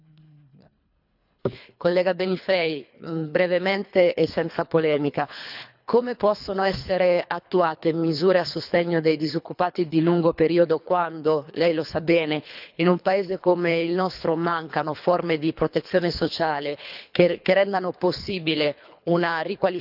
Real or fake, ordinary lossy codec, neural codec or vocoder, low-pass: fake; none; codec, 24 kHz, 3 kbps, HILCodec; 5.4 kHz